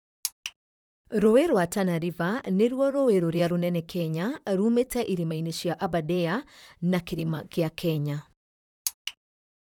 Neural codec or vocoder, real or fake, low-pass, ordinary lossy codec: vocoder, 44.1 kHz, 128 mel bands, Pupu-Vocoder; fake; 19.8 kHz; none